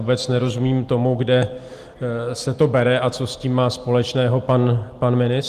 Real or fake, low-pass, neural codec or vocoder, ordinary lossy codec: real; 14.4 kHz; none; Opus, 24 kbps